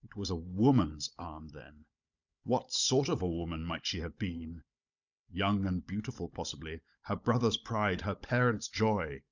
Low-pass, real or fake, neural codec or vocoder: 7.2 kHz; fake; codec, 16 kHz, 16 kbps, FunCodec, trained on Chinese and English, 50 frames a second